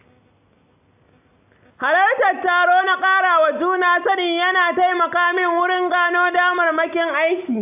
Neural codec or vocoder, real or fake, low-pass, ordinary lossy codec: none; real; 3.6 kHz; AAC, 32 kbps